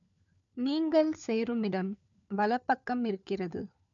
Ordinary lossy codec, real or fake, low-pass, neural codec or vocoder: none; fake; 7.2 kHz; codec, 16 kHz, 4 kbps, FunCodec, trained on LibriTTS, 50 frames a second